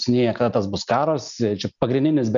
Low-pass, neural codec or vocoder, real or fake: 7.2 kHz; none; real